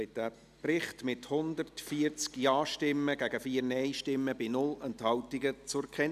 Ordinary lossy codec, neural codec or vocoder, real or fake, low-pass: none; none; real; 14.4 kHz